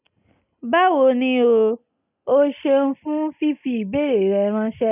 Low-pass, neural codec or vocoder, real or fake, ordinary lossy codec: 3.6 kHz; none; real; none